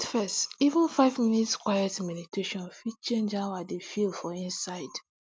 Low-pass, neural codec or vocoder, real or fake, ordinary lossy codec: none; none; real; none